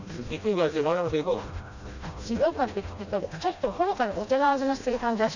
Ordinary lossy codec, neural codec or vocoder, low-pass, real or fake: none; codec, 16 kHz, 1 kbps, FreqCodec, smaller model; 7.2 kHz; fake